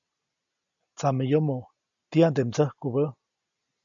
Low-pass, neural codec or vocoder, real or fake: 7.2 kHz; none; real